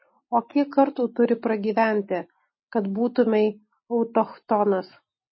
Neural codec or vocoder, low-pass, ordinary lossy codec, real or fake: none; 7.2 kHz; MP3, 24 kbps; real